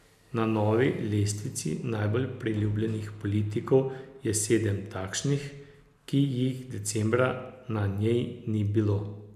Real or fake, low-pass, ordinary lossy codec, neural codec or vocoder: real; 14.4 kHz; none; none